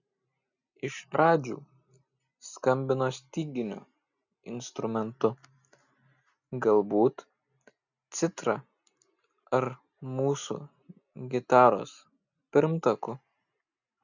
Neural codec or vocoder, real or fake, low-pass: none; real; 7.2 kHz